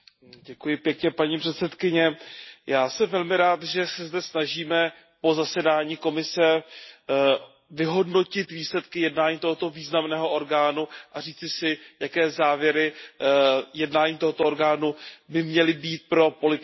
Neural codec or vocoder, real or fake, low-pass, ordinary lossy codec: none; real; 7.2 kHz; MP3, 24 kbps